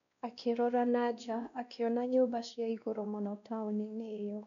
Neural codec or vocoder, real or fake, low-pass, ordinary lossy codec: codec, 16 kHz, 1 kbps, X-Codec, WavLM features, trained on Multilingual LibriSpeech; fake; 7.2 kHz; none